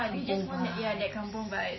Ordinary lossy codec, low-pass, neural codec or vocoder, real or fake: MP3, 24 kbps; 7.2 kHz; none; real